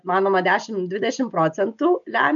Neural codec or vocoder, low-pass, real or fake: none; 7.2 kHz; real